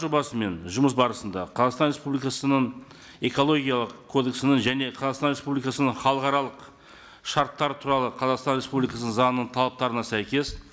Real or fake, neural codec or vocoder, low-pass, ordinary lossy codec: real; none; none; none